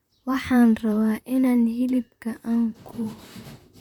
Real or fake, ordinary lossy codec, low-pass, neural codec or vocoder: fake; none; 19.8 kHz; vocoder, 44.1 kHz, 128 mel bands, Pupu-Vocoder